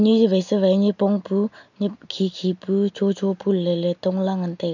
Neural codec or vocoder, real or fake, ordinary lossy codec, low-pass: none; real; none; 7.2 kHz